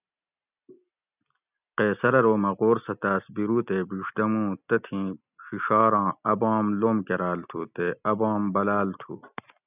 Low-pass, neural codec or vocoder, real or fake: 3.6 kHz; none; real